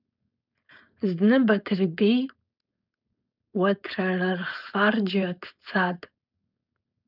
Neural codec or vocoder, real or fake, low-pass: codec, 16 kHz, 4.8 kbps, FACodec; fake; 5.4 kHz